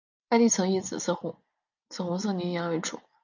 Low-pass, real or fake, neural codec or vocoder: 7.2 kHz; real; none